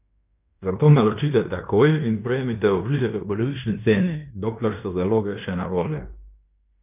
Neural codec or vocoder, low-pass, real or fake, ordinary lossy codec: codec, 16 kHz in and 24 kHz out, 0.9 kbps, LongCat-Audio-Codec, fine tuned four codebook decoder; 3.6 kHz; fake; none